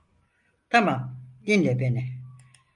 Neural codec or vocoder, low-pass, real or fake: none; 10.8 kHz; real